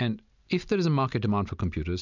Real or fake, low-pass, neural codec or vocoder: real; 7.2 kHz; none